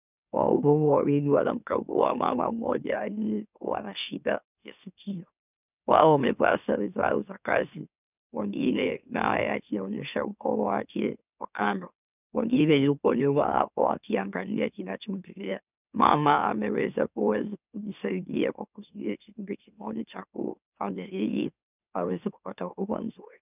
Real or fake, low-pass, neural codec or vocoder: fake; 3.6 kHz; autoencoder, 44.1 kHz, a latent of 192 numbers a frame, MeloTTS